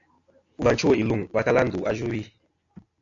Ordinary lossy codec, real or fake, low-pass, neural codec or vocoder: AAC, 48 kbps; real; 7.2 kHz; none